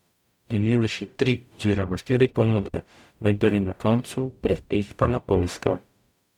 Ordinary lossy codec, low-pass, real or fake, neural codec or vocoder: none; 19.8 kHz; fake; codec, 44.1 kHz, 0.9 kbps, DAC